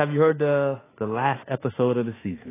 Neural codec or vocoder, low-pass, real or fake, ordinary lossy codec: autoencoder, 48 kHz, 32 numbers a frame, DAC-VAE, trained on Japanese speech; 3.6 kHz; fake; AAC, 16 kbps